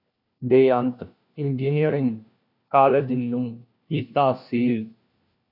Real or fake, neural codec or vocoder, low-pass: fake; codec, 16 kHz, 1 kbps, FunCodec, trained on LibriTTS, 50 frames a second; 5.4 kHz